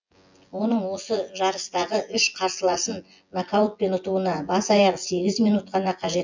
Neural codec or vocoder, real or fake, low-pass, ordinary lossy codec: vocoder, 24 kHz, 100 mel bands, Vocos; fake; 7.2 kHz; MP3, 64 kbps